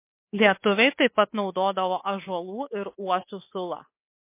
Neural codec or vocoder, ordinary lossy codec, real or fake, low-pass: codec, 24 kHz, 3.1 kbps, DualCodec; MP3, 24 kbps; fake; 3.6 kHz